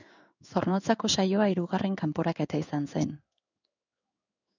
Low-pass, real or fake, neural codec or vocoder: 7.2 kHz; fake; codec, 16 kHz in and 24 kHz out, 1 kbps, XY-Tokenizer